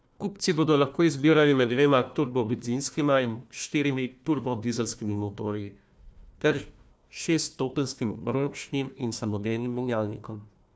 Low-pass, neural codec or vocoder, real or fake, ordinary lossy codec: none; codec, 16 kHz, 1 kbps, FunCodec, trained on Chinese and English, 50 frames a second; fake; none